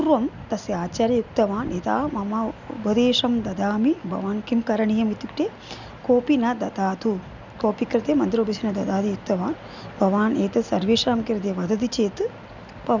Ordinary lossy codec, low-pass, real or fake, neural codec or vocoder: none; 7.2 kHz; real; none